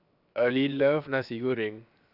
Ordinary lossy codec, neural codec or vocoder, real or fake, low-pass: none; codec, 16 kHz, 0.7 kbps, FocalCodec; fake; 5.4 kHz